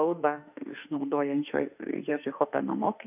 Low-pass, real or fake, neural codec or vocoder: 3.6 kHz; fake; autoencoder, 48 kHz, 32 numbers a frame, DAC-VAE, trained on Japanese speech